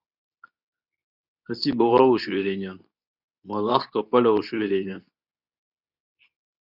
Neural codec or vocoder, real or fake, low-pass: codec, 24 kHz, 0.9 kbps, WavTokenizer, medium speech release version 2; fake; 5.4 kHz